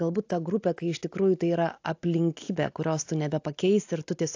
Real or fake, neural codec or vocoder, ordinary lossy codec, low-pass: real; none; AAC, 48 kbps; 7.2 kHz